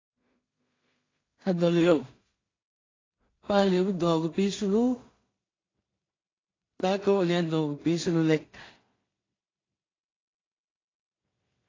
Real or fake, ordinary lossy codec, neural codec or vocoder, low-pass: fake; AAC, 32 kbps; codec, 16 kHz in and 24 kHz out, 0.4 kbps, LongCat-Audio-Codec, two codebook decoder; 7.2 kHz